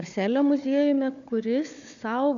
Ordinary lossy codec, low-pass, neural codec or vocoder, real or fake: AAC, 96 kbps; 7.2 kHz; codec, 16 kHz, 4 kbps, FunCodec, trained on Chinese and English, 50 frames a second; fake